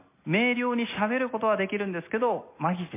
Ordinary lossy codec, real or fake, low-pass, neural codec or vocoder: MP3, 24 kbps; real; 3.6 kHz; none